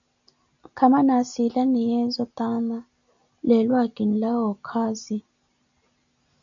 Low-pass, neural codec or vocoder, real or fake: 7.2 kHz; none; real